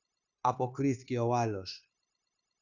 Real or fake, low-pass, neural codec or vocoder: fake; 7.2 kHz; codec, 16 kHz, 0.9 kbps, LongCat-Audio-Codec